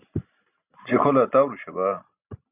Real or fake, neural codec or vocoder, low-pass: real; none; 3.6 kHz